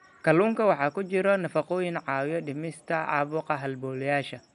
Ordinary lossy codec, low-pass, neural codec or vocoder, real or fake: none; 10.8 kHz; none; real